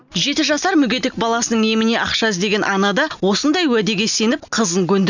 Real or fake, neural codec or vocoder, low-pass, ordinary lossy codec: real; none; 7.2 kHz; none